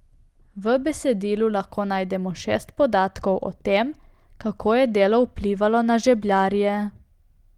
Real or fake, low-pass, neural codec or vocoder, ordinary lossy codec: real; 19.8 kHz; none; Opus, 24 kbps